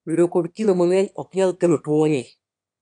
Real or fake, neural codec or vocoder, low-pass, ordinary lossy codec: fake; autoencoder, 22.05 kHz, a latent of 192 numbers a frame, VITS, trained on one speaker; 9.9 kHz; MP3, 96 kbps